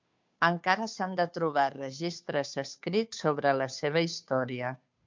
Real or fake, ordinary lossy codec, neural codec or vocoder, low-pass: fake; MP3, 64 kbps; codec, 16 kHz, 2 kbps, FunCodec, trained on Chinese and English, 25 frames a second; 7.2 kHz